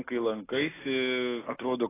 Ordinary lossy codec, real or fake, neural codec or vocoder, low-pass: AAC, 16 kbps; real; none; 3.6 kHz